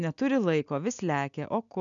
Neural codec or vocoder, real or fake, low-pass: none; real; 7.2 kHz